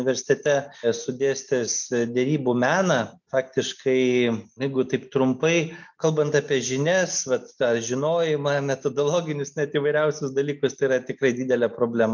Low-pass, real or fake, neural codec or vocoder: 7.2 kHz; real; none